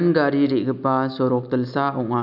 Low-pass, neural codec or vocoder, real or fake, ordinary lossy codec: 5.4 kHz; none; real; none